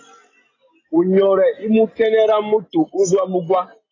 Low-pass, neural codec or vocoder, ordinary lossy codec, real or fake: 7.2 kHz; none; AAC, 32 kbps; real